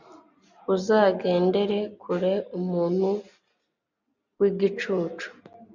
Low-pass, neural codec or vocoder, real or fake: 7.2 kHz; none; real